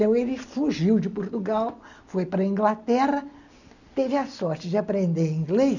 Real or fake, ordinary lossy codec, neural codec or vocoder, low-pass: real; none; none; 7.2 kHz